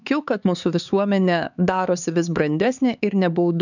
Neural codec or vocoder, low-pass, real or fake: codec, 16 kHz, 4 kbps, X-Codec, HuBERT features, trained on LibriSpeech; 7.2 kHz; fake